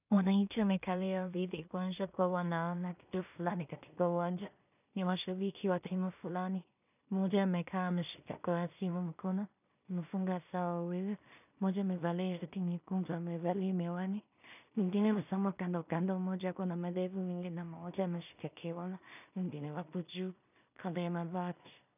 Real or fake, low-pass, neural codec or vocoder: fake; 3.6 kHz; codec, 16 kHz in and 24 kHz out, 0.4 kbps, LongCat-Audio-Codec, two codebook decoder